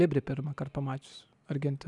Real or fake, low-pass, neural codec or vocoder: real; 10.8 kHz; none